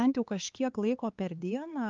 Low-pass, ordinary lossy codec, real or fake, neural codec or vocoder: 7.2 kHz; Opus, 32 kbps; fake; codec, 16 kHz, 4 kbps, X-Codec, HuBERT features, trained on LibriSpeech